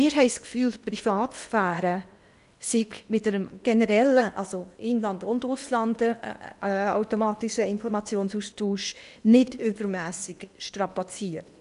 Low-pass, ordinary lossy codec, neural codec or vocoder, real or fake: 10.8 kHz; none; codec, 16 kHz in and 24 kHz out, 0.8 kbps, FocalCodec, streaming, 65536 codes; fake